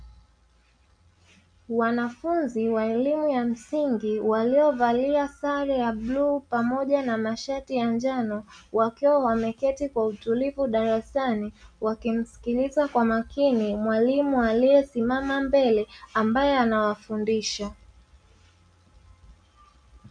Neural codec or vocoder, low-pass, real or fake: none; 9.9 kHz; real